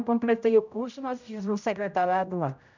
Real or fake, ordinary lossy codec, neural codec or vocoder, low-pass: fake; none; codec, 16 kHz, 0.5 kbps, X-Codec, HuBERT features, trained on general audio; 7.2 kHz